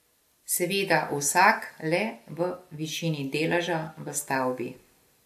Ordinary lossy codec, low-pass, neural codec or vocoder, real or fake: MP3, 64 kbps; 14.4 kHz; none; real